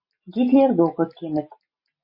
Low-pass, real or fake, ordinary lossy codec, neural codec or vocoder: 5.4 kHz; real; MP3, 32 kbps; none